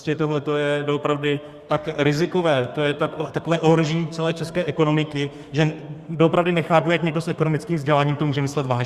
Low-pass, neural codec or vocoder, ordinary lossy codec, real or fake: 14.4 kHz; codec, 32 kHz, 1.9 kbps, SNAC; Opus, 64 kbps; fake